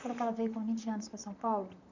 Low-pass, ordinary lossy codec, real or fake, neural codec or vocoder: 7.2 kHz; none; fake; vocoder, 44.1 kHz, 128 mel bands, Pupu-Vocoder